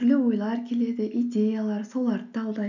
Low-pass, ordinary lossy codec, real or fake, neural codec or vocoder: 7.2 kHz; none; real; none